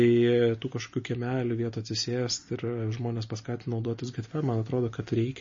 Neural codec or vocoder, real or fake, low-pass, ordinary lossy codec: none; real; 7.2 kHz; MP3, 32 kbps